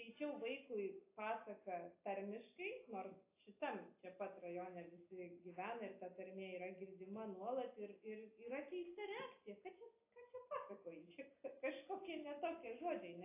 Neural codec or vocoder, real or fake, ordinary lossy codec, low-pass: none; real; AAC, 24 kbps; 3.6 kHz